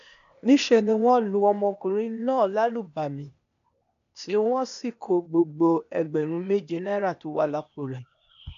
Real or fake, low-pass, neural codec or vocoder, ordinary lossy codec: fake; 7.2 kHz; codec, 16 kHz, 0.8 kbps, ZipCodec; none